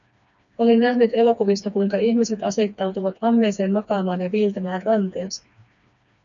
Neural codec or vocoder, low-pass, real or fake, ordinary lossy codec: codec, 16 kHz, 2 kbps, FreqCodec, smaller model; 7.2 kHz; fake; MP3, 96 kbps